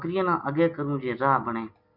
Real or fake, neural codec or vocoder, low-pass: real; none; 5.4 kHz